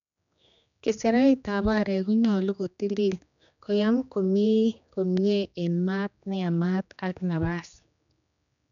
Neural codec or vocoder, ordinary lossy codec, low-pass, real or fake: codec, 16 kHz, 2 kbps, X-Codec, HuBERT features, trained on general audio; none; 7.2 kHz; fake